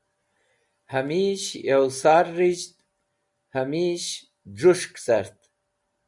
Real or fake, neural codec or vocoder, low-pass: real; none; 10.8 kHz